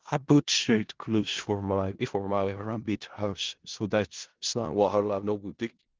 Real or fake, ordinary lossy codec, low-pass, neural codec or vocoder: fake; Opus, 16 kbps; 7.2 kHz; codec, 16 kHz in and 24 kHz out, 0.4 kbps, LongCat-Audio-Codec, four codebook decoder